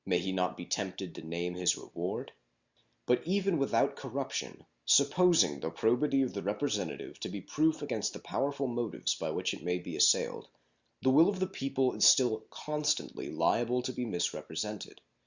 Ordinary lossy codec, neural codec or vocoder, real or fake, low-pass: Opus, 64 kbps; none; real; 7.2 kHz